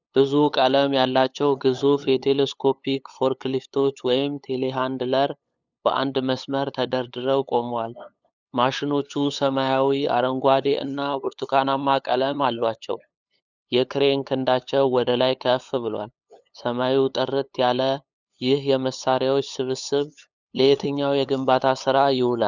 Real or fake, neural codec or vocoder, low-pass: fake; codec, 16 kHz, 8 kbps, FunCodec, trained on LibriTTS, 25 frames a second; 7.2 kHz